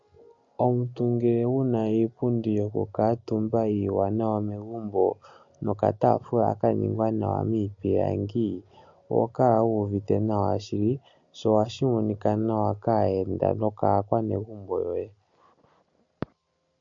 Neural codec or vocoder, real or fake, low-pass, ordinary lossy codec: none; real; 7.2 kHz; MP3, 48 kbps